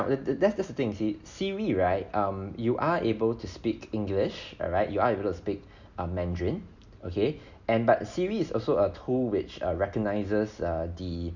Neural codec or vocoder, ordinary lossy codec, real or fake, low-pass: none; none; real; 7.2 kHz